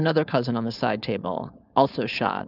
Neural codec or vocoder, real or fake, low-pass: codec, 16 kHz, 4.8 kbps, FACodec; fake; 5.4 kHz